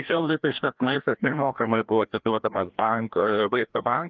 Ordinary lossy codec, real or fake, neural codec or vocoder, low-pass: Opus, 32 kbps; fake; codec, 16 kHz, 1 kbps, FreqCodec, larger model; 7.2 kHz